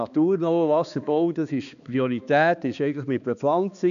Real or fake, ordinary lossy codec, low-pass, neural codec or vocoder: fake; AAC, 96 kbps; 7.2 kHz; codec, 16 kHz, 2 kbps, X-Codec, HuBERT features, trained on balanced general audio